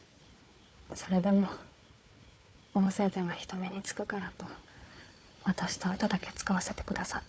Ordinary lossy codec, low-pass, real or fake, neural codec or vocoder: none; none; fake; codec, 16 kHz, 4 kbps, FunCodec, trained on Chinese and English, 50 frames a second